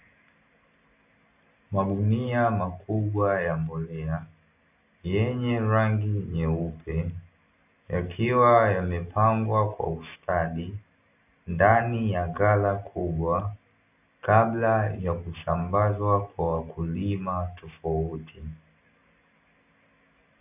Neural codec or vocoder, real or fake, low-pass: none; real; 3.6 kHz